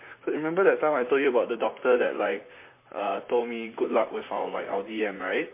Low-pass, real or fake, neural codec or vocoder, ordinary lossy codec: 3.6 kHz; fake; vocoder, 44.1 kHz, 128 mel bands, Pupu-Vocoder; MP3, 24 kbps